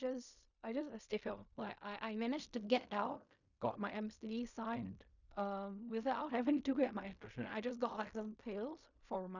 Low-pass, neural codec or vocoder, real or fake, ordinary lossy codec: 7.2 kHz; codec, 16 kHz in and 24 kHz out, 0.4 kbps, LongCat-Audio-Codec, fine tuned four codebook decoder; fake; none